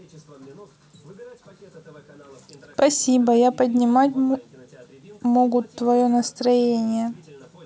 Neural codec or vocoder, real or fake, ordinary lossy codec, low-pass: none; real; none; none